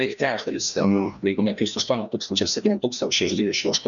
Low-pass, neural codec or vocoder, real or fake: 7.2 kHz; codec, 16 kHz, 1 kbps, FreqCodec, larger model; fake